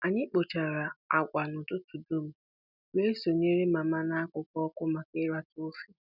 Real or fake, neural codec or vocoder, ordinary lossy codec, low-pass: real; none; none; 5.4 kHz